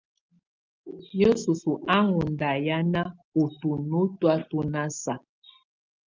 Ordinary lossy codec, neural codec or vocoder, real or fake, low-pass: Opus, 32 kbps; none; real; 7.2 kHz